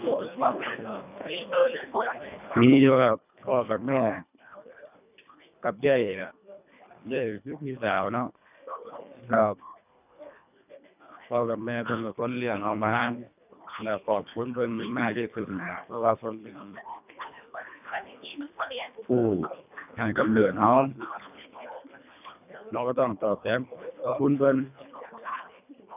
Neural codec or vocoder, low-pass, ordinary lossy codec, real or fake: codec, 24 kHz, 1.5 kbps, HILCodec; 3.6 kHz; none; fake